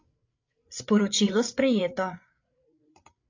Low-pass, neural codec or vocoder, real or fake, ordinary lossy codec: 7.2 kHz; codec, 16 kHz, 8 kbps, FreqCodec, larger model; fake; AAC, 48 kbps